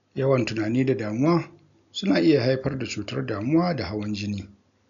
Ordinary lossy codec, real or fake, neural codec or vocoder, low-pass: none; real; none; 7.2 kHz